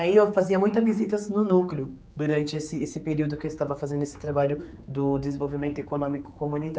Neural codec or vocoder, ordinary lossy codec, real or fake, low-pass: codec, 16 kHz, 4 kbps, X-Codec, HuBERT features, trained on general audio; none; fake; none